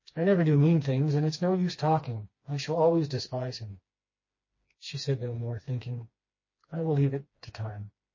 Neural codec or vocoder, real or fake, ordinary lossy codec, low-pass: codec, 16 kHz, 2 kbps, FreqCodec, smaller model; fake; MP3, 32 kbps; 7.2 kHz